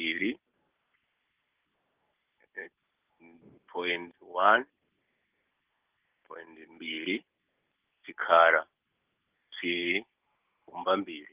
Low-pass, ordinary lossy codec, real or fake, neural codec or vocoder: 3.6 kHz; Opus, 32 kbps; real; none